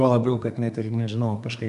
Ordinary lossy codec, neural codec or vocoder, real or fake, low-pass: AAC, 96 kbps; codec, 24 kHz, 1 kbps, SNAC; fake; 10.8 kHz